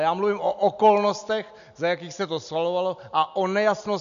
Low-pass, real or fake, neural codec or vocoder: 7.2 kHz; real; none